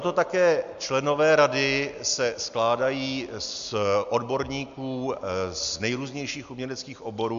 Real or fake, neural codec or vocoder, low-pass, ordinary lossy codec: real; none; 7.2 kHz; MP3, 64 kbps